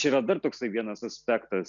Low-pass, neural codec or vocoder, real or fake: 7.2 kHz; none; real